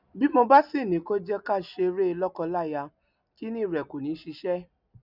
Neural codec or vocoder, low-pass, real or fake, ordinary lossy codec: none; 5.4 kHz; real; none